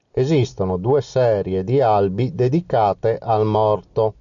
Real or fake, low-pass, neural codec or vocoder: real; 7.2 kHz; none